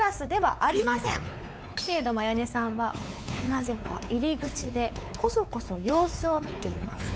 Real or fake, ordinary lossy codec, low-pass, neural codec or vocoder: fake; none; none; codec, 16 kHz, 4 kbps, X-Codec, WavLM features, trained on Multilingual LibriSpeech